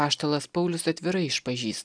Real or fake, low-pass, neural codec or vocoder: real; 9.9 kHz; none